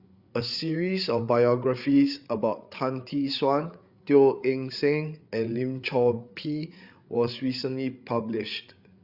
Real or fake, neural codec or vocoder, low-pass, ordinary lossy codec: fake; codec, 16 kHz, 8 kbps, FreqCodec, larger model; 5.4 kHz; Opus, 64 kbps